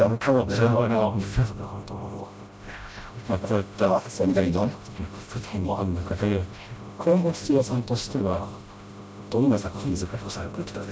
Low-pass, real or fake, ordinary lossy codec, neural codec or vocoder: none; fake; none; codec, 16 kHz, 0.5 kbps, FreqCodec, smaller model